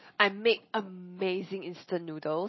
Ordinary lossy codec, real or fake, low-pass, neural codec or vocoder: MP3, 24 kbps; real; 7.2 kHz; none